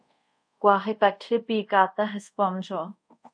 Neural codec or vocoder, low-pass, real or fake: codec, 24 kHz, 0.5 kbps, DualCodec; 9.9 kHz; fake